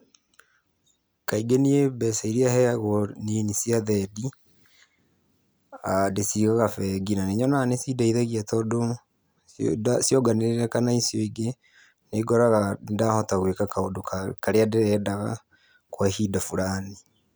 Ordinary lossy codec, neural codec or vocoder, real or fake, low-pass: none; none; real; none